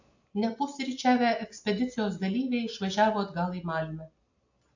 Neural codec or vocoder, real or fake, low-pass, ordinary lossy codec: none; real; 7.2 kHz; AAC, 48 kbps